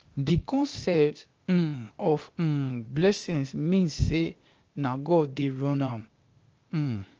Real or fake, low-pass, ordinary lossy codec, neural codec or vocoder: fake; 7.2 kHz; Opus, 24 kbps; codec, 16 kHz, 0.8 kbps, ZipCodec